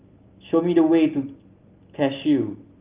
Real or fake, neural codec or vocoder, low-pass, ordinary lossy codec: real; none; 3.6 kHz; Opus, 32 kbps